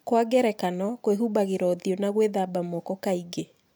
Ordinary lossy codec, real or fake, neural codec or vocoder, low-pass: none; real; none; none